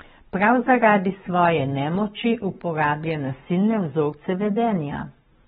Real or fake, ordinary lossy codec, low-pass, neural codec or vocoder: fake; AAC, 16 kbps; 19.8 kHz; codec, 44.1 kHz, 7.8 kbps, Pupu-Codec